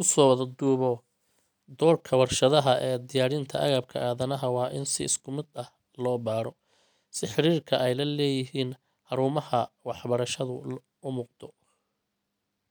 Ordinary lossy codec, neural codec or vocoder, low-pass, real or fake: none; none; none; real